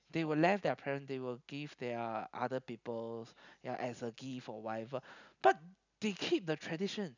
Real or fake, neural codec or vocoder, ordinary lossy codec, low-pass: real; none; none; 7.2 kHz